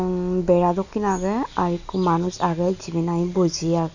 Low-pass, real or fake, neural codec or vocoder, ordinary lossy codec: 7.2 kHz; real; none; none